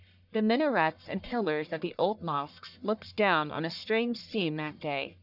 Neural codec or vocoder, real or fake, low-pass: codec, 44.1 kHz, 1.7 kbps, Pupu-Codec; fake; 5.4 kHz